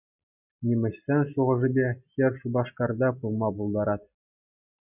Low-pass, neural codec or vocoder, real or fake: 3.6 kHz; none; real